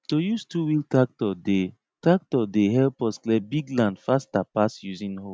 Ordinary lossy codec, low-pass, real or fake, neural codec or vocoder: none; none; real; none